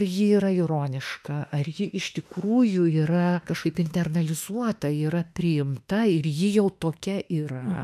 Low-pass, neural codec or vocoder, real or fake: 14.4 kHz; autoencoder, 48 kHz, 32 numbers a frame, DAC-VAE, trained on Japanese speech; fake